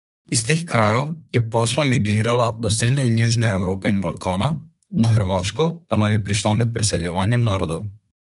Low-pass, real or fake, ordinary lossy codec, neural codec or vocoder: 10.8 kHz; fake; MP3, 96 kbps; codec, 24 kHz, 1 kbps, SNAC